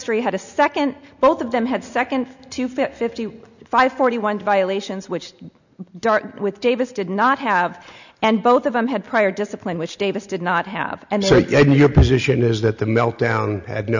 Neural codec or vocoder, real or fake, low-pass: none; real; 7.2 kHz